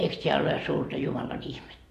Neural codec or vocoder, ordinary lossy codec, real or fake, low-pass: none; AAC, 48 kbps; real; 14.4 kHz